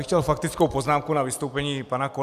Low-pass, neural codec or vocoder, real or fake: 14.4 kHz; none; real